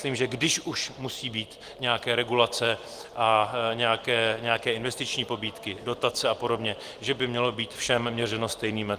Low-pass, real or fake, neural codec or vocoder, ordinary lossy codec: 14.4 kHz; real; none; Opus, 24 kbps